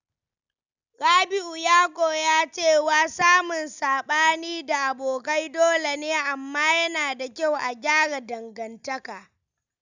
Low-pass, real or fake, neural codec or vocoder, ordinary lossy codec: 7.2 kHz; real; none; none